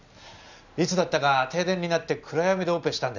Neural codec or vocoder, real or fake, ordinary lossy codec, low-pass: none; real; none; 7.2 kHz